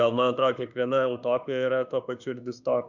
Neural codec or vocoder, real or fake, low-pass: autoencoder, 48 kHz, 32 numbers a frame, DAC-VAE, trained on Japanese speech; fake; 7.2 kHz